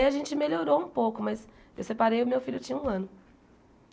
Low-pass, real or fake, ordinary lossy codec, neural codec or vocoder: none; real; none; none